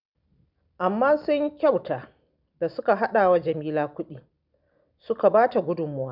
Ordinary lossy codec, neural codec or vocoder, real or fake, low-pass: none; none; real; 5.4 kHz